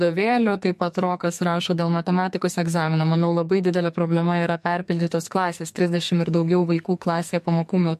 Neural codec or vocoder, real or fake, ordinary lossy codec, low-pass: codec, 44.1 kHz, 2.6 kbps, SNAC; fake; MP3, 64 kbps; 14.4 kHz